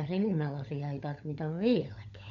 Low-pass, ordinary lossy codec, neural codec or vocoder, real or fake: 7.2 kHz; none; codec, 16 kHz, 16 kbps, FunCodec, trained on LibriTTS, 50 frames a second; fake